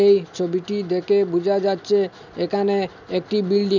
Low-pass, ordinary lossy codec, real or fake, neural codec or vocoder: 7.2 kHz; none; real; none